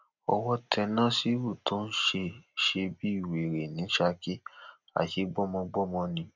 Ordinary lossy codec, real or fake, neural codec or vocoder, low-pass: none; real; none; 7.2 kHz